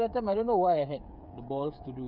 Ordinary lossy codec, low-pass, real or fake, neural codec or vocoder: none; 5.4 kHz; fake; codec, 16 kHz, 8 kbps, FreqCodec, smaller model